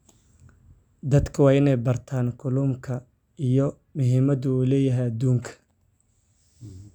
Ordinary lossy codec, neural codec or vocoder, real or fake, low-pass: none; none; real; 19.8 kHz